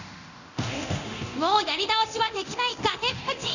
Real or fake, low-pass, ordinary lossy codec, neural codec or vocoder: fake; 7.2 kHz; none; codec, 24 kHz, 0.9 kbps, DualCodec